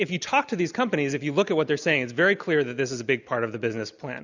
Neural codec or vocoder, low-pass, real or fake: none; 7.2 kHz; real